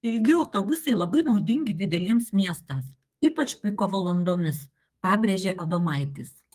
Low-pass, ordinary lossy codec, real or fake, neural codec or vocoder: 14.4 kHz; Opus, 32 kbps; fake; codec, 32 kHz, 1.9 kbps, SNAC